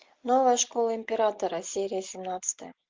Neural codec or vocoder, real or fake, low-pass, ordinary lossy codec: none; real; 7.2 kHz; Opus, 32 kbps